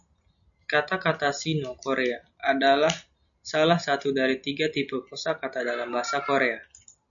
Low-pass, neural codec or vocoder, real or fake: 7.2 kHz; none; real